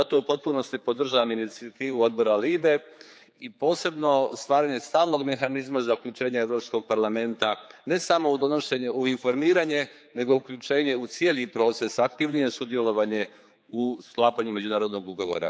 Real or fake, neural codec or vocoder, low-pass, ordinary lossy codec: fake; codec, 16 kHz, 4 kbps, X-Codec, HuBERT features, trained on general audio; none; none